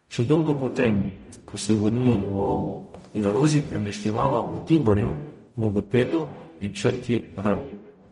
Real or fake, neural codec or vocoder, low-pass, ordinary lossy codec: fake; codec, 44.1 kHz, 0.9 kbps, DAC; 19.8 kHz; MP3, 48 kbps